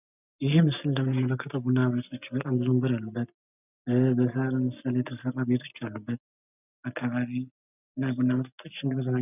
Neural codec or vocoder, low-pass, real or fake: none; 3.6 kHz; real